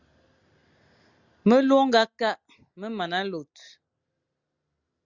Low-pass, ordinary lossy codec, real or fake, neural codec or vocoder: 7.2 kHz; Opus, 64 kbps; real; none